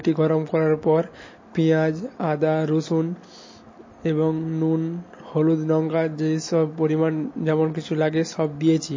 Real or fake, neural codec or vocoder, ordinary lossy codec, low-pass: real; none; MP3, 32 kbps; 7.2 kHz